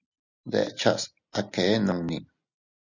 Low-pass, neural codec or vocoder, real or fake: 7.2 kHz; none; real